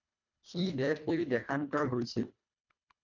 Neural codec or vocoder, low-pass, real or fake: codec, 24 kHz, 1.5 kbps, HILCodec; 7.2 kHz; fake